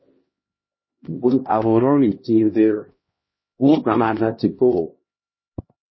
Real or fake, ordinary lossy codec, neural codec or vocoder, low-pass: fake; MP3, 24 kbps; codec, 16 kHz, 1 kbps, X-Codec, HuBERT features, trained on LibriSpeech; 7.2 kHz